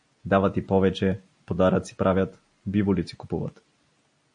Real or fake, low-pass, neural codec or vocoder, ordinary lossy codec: real; 9.9 kHz; none; MP3, 48 kbps